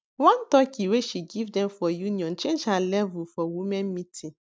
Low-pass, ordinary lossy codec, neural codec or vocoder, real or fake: none; none; none; real